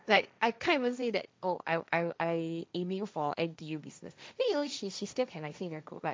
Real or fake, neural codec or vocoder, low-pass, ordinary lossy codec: fake; codec, 16 kHz, 1.1 kbps, Voila-Tokenizer; none; none